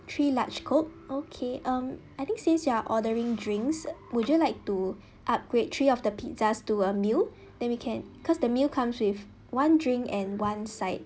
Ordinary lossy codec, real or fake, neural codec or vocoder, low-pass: none; real; none; none